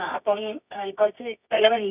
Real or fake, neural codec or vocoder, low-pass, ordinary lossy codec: fake; codec, 24 kHz, 0.9 kbps, WavTokenizer, medium music audio release; 3.6 kHz; none